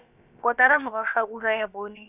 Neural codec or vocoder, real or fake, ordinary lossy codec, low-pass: codec, 16 kHz, about 1 kbps, DyCAST, with the encoder's durations; fake; none; 3.6 kHz